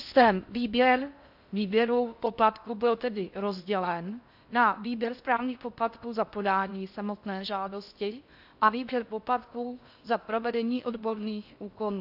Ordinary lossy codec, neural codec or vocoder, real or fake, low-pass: AAC, 48 kbps; codec, 16 kHz in and 24 kHz out, 0.6 kbps, FocalCodec, streaming, 4096 codes; fake; 5.4 kHz